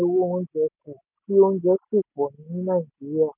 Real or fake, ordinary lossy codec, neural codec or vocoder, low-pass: real; none; none; 3.6 kHz